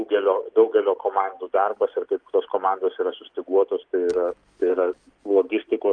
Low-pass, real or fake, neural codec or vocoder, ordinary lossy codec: 9.9 kHz; real; none; Opus, 32 kbps